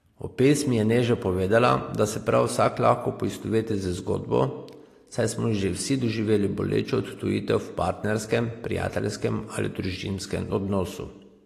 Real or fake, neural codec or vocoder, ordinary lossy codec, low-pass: real; none; AAC, 48 kbps; 14.4 kHz